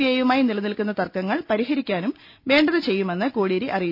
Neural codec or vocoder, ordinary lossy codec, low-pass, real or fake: none; none; 5.4 kHz; real